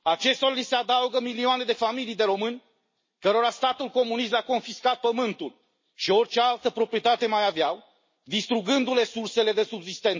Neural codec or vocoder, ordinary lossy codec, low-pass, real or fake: none; MP3, 32 kbps; 7.2 kHz; real